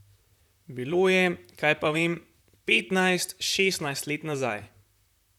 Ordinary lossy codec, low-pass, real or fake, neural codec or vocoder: none; 19.8 kHz; fake; vocoder, 44.1 kHz, 128 mel bands, Pupu-Vocoder